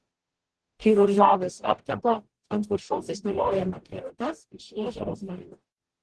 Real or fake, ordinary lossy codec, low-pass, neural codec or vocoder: fake; Opus, 16 kbps; 10.8 kHz; codec, 44.1 kHz, 0.9 kbps, DAC